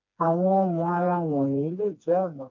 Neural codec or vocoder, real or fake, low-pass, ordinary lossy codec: codec, 16 kHz, 2 kbps, FreqCodec, smaller model; fake; 7.2 kHz; MP3, 64 kbps